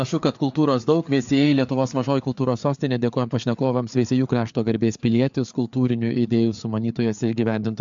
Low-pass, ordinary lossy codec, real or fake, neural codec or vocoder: 7.2 kHz; MP3, 64 kbps; fake; codec, 16 kHz, 4 kbps, FreqCodec, larger model